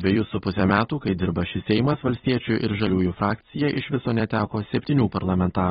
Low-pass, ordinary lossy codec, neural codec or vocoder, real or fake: 19.8 kHz; AAC, 16 kbps; vocoder, 44.1 kHz, 128 mel bands every 256 samples, BigVGAN v2; fake